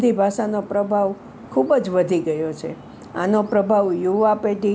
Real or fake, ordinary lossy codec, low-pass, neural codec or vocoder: real; none; none; none